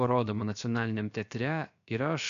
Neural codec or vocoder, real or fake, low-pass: codec, 16 kHz, 0.7 kbps, FocalCodec; fake; 7.2 kHz